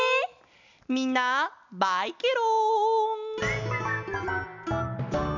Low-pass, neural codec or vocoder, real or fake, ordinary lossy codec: 7.2 kHz; none; real; none